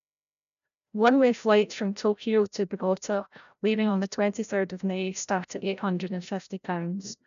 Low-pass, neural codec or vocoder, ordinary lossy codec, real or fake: 7.2 kHz; codec, 16 kHz, 0.5 kbps, FreqCodec, larger model; none; fake